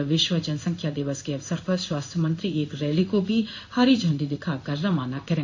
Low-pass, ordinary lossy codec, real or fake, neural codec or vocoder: 7.2 kHz; AAC, 48 kbps; fake; codec, 16 kHz in and 24 kHz out, 1 kbps, XY-Tokenizer